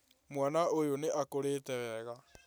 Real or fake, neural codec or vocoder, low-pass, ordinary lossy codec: real; none; none; none